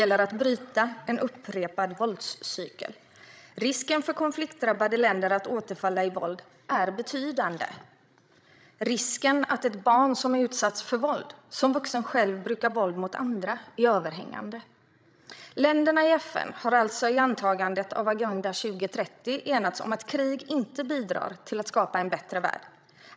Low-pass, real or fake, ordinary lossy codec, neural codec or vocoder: none; fake; none; codec, 16 kHz, 16 kbps, FreqCodec, larger model